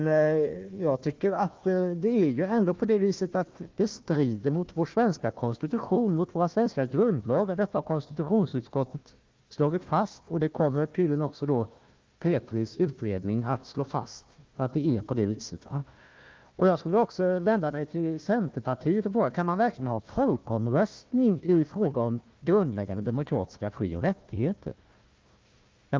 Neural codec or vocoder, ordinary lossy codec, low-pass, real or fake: codec, 16 kHz, 1 kbps, FunCodec, trained on Chinese and English, 50 frames a second; Opus, 32 kbps; 7.2 kHz; fake